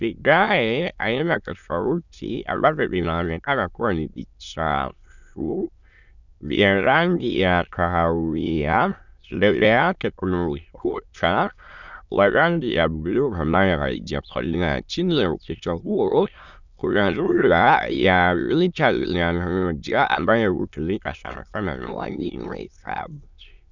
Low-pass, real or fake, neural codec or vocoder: 7.2 kHz; fake; autoencoder, 22.05 kHz, a latent of 192 numbers a frame, VITS, trained on many speakers